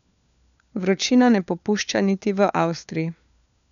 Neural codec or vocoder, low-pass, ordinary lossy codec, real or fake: codec, 16 kHz, 4 kbps, FunCodec, trained on LibriTTS, 50 frames a second; 7.2 kHz; none; fake